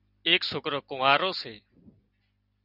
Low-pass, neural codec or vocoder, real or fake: 5.4 kHz; none; real